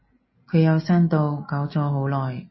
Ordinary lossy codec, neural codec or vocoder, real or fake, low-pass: MP3, 24 kbps; none; real; 7.2 kHz